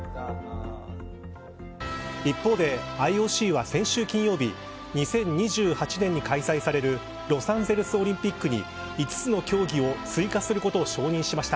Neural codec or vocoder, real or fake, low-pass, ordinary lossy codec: none; real; none; none